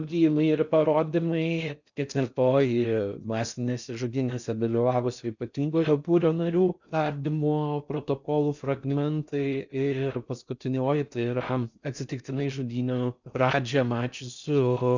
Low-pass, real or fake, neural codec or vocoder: 7.2 kHz; fake; codec, 16 kHz in and 24 kHz out, 0.6 kbps, FocalCodec, streaming, 4096 codes